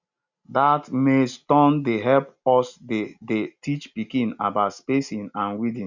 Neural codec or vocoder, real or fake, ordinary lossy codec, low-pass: none; real; none; 7.2 kHz